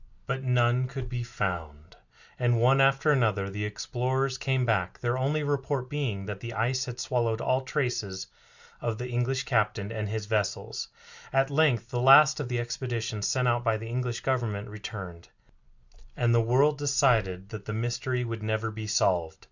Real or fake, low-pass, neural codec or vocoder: real; 7.2 kHz; none